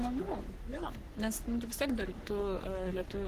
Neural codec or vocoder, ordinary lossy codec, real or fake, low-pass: codec, 44.1 kHz, 3.4 kbps, Pupu-Codec; Opus, 16 kbps; fake; 14.4 kHz